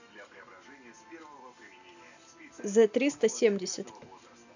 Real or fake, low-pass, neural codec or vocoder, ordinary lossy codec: real; 7.2 kHz; none; none